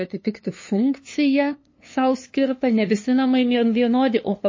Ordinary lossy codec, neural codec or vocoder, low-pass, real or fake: MP3, 32 kbps; codec, 16 kHz, 2 kbps, FunCodec, trained on LibriTTS, 25 frames a second; 7.2 kHz; fake